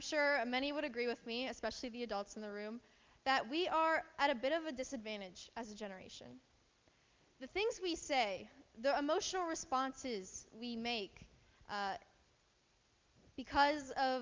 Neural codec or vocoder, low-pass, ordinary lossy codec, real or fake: none; 7.2 kHz; Opus, 24 kbps; real